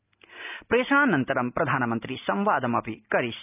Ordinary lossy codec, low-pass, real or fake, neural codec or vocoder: none; 3.6 kHz; real; none